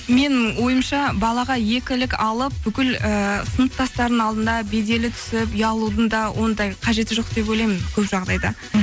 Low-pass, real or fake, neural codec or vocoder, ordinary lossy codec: none; real; none; none